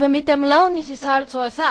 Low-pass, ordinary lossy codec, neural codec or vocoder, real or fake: 9.9 kHz; AAC, 48 kbps; codec, 16 kHz in and 24 kHz out, 0.4 kbps, LongCat-Audio-Codec, fine tuned four codebook decoder; fake